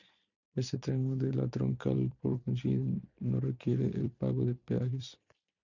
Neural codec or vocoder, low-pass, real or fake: vocoder, 44.1 kHz, 128 mel bands every 256 samples, BigVGAN v2; 7.2 kHz; fake